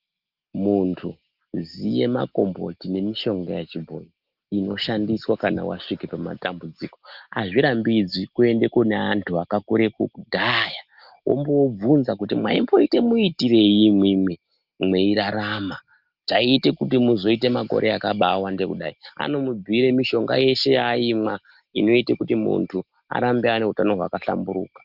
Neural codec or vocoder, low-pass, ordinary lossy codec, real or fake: none; 5.4 kHz; Opus, 32 kbps; real